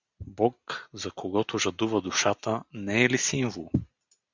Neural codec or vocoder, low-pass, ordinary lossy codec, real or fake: none; 7.2 kHz; Opus, 64 kbps; real